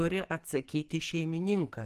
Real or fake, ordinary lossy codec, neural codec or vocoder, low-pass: fake; Opus, 24 kbps; codec, 44.1 kHz, 2.6 kbps, SNAC; 14.4 kHz